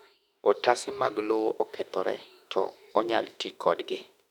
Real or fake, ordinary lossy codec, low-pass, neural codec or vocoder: fake; none; 19.8 kHz; autoencoder, 48 kHz, 32 numbers a frame, DAC-VAE, trained on Japanese speech